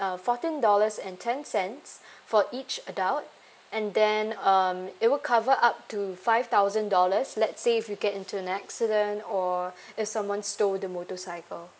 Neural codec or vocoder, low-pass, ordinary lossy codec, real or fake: none; none; none; real